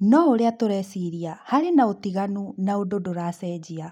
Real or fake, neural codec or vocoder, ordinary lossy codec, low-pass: real; none; none; 19.8 kHz